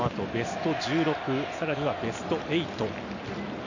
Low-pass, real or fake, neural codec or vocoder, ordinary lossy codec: 7.2 kHz; real; none; none